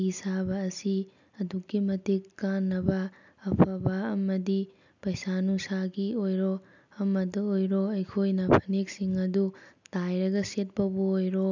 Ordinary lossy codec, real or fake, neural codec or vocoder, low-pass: none; real; none; 7.2 kHz